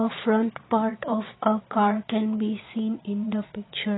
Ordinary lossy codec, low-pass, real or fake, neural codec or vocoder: AAC, 16 kbps; 7.2 kHz; fake; vocoder, 22.05 kHz, 80 mel bands, Vocos